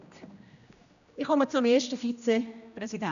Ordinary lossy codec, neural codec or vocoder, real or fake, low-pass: none; codec, 16 kHz, 1 kbps, X-Codec, HuBERT features, trained on general audio; fake; 7.2 kHz